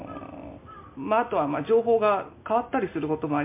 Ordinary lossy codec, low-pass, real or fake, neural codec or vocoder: MP3, 24 kbps; 3.6 kHz; real; none